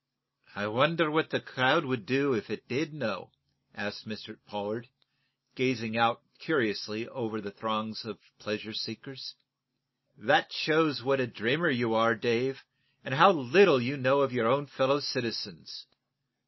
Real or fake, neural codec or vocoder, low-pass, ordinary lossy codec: real; none; 7.2 kHz; MP3, 24 kbps